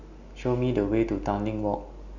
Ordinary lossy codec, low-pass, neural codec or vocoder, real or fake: Opus, 64 kbps; 7.2 kHz; none; real